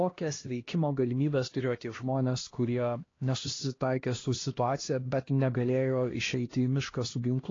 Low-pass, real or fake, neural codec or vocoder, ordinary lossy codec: 7.2 kHz; fake; codec, 16 kHz, 1 kbps, X-Codec, HuBERT features, trained on LibriSpeech; AAC, 32 kbps